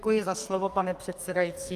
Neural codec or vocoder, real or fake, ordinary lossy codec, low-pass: codec, 44.1 kHz, 2.6 kbps, SNAC; fake; Opus, 32 kbps; 14.4 kHz